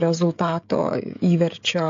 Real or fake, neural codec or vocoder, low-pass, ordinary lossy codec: fake; codec, 16 kHz, 16 kbps, FreqCodec, smaller model; 7.2 kHz; AAC, 48 kbps